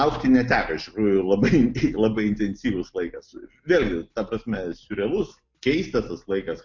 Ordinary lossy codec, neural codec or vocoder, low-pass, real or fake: MP3, 48 kbps; none; 7.2 kHz; real